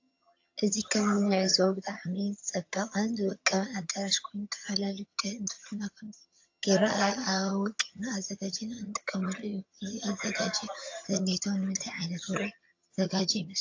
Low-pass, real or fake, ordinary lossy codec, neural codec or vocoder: 7.2 kHz; fake; AAC, 48 kbps; vocoder, 22.05 kHz, 80 mel bands, HiFi-GAN